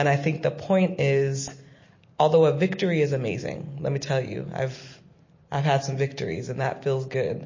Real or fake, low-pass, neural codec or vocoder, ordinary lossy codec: real; 7.2 kHz; none; MP3, 32 kbps